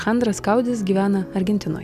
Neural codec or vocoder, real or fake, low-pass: none; real; 14.4 kHz